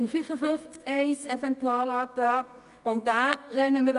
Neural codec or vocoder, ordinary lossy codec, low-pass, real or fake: codec, 24 kHz, 0.9 kbps, WavTokenizer, medium music audio release; none; 10.8 kHz; fake